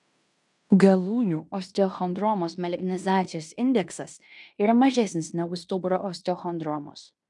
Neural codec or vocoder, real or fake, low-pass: codec, 16 kHz in and 24 kHz out, 0.9 kbps, LongCat-Audio-Codec, fine tuned four codebook decoder; fake; 10.8 kHz